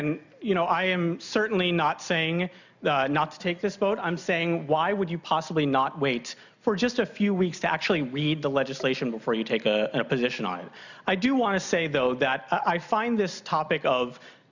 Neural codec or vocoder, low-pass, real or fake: none; 7.2 kHz; real